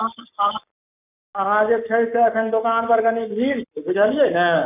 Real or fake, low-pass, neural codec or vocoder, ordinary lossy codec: fake; 3.6 kHz; codec, 44.1 kHz, 7.8 kbps, Pupu-Codec; none